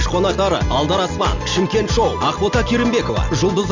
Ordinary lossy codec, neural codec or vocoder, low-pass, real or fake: Opus, 64 kbps; none; 7.2 kHz; real